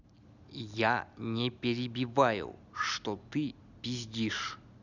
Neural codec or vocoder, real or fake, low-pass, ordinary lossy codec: none; real; 7.2 kHz; none